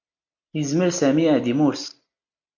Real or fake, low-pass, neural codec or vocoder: real; 7.2 kHz; none